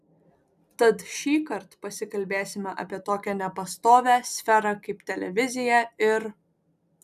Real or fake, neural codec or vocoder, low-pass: real; none; 14.4 kHz